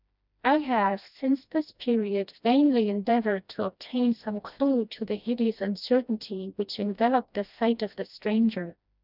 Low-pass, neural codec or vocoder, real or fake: 5.4 kHz; codec, 16 kHz, 1 kbps, FreqCodec, smaller model; fake